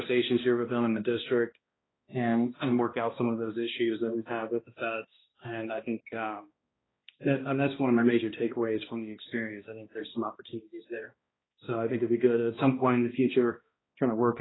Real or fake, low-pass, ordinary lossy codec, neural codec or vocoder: fake; 7.2 kHz; AAC, 16 kbps; codec, 16 kHz, 1 kbps, X-Codec, HuBERT features, trained on balanced general audio